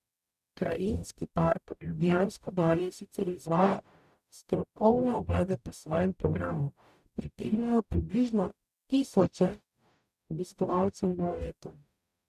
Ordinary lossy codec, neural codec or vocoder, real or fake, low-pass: none; codec, 44.1 kHz, 0.9 kbps, DAC; fake; 14.4 kHz